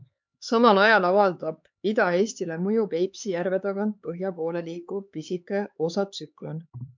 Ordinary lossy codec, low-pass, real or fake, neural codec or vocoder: MP3, 64 kbps; 7.2 kHz; fake; codec, 16 kHz, 4 kbps, X-Codec, HuBERT features, trained on LibriSpeech